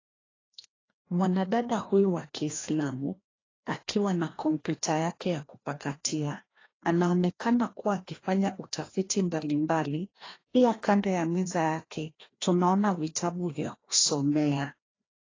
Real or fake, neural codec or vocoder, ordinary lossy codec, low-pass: fake; codec, 16 kHz, 1 kbps, FreqCodec, larger model; AAC, 32 kbps; 7.2 kHz